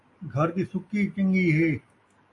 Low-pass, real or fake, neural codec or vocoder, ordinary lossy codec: 10.8 kHz; real; none; AAC, 48 kbps